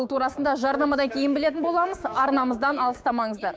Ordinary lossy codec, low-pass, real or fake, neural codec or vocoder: none; none; fake; codec, 16 kHz, 6 kbps, DAC